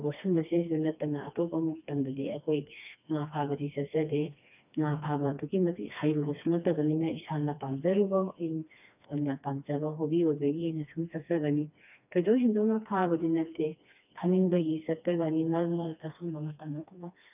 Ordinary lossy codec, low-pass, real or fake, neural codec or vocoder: none; 3.6 kHz; fake; codec, 16 kHz, 2 kbps, FreqCodec, smaller model